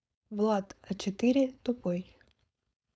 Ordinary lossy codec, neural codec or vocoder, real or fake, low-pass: none; codec, 16 kHz, 4.8 kbps, FACodec; fake; none